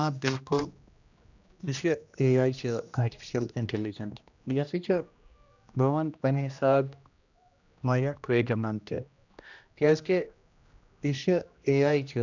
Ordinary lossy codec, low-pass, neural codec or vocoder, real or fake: none; 7.2 kHz; codec, 16 kHz, 1 kbps, X-Codec, HuBERT features, trained on general audio; fake